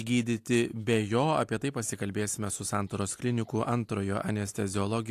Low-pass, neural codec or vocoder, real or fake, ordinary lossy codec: 14.4 kHz; none; real; AAC, 64 kbps